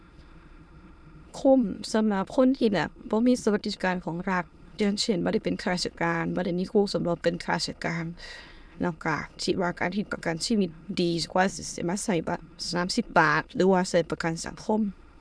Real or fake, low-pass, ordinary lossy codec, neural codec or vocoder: fake; none; none; autoencoder, 22.05 kHz, a latent of 192 numbers a frame, VITS, trained on many speakers